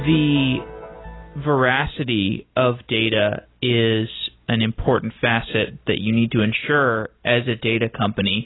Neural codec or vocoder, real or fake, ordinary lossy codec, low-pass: none; real; AAC, 16 kbps; 7.2 kHz